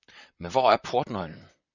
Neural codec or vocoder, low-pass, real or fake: vocoder, 44.1 kHz, 128 mel bands, Pupu-Vocoder; 7.2 kHz; fake